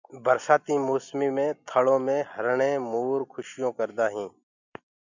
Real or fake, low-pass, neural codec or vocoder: real; 7.2 kHz; none